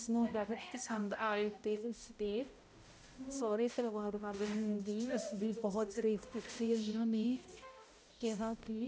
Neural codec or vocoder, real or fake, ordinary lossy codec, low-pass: codec, 16 kHz, 0.5 kbps, X-Codec, HuBERT features, trained on balanced general audio; fake; none; none